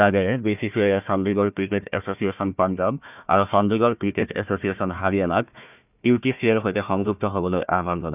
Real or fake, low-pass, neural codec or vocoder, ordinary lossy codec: fake; 3.6 kHz; codec, 16 kHz, 1 kbps, FunCodec, trained on Chinese and English, 50 frames a second; none